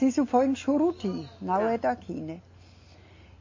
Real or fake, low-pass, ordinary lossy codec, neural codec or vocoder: real; 7.2 kHz; MP3, 32 kbps; none